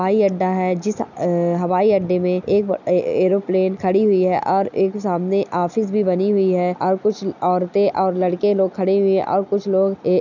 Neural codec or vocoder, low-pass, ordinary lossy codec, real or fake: none; 7.2 kHz; none; real